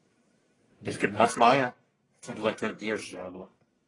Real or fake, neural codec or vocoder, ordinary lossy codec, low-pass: fake; codec, 44.1 kHz, 1.7 kbps, Pupu-Codec; AAC, 32 kbps; 10.8 kHz